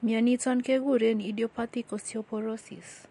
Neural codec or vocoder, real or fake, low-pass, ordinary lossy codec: none; real; 14.4 kHz; MP3, 48 kbps